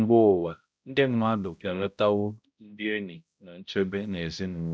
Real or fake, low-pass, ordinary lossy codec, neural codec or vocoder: fake; none; none; codec, 16 kHz, 0.5 kbps, X-Codec, HuBERT features, trained on balanced general audio